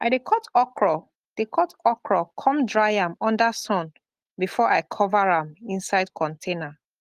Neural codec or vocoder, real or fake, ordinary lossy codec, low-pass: none; real; Opus, 24 kbps; 14.4 kHz